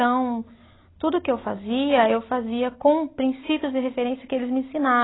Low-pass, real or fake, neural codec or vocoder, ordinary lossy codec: 7.2 kHz; real; none; AAC, 16 kbps